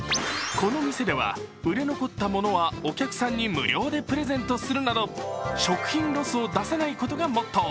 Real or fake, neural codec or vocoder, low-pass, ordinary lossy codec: real; none; none; none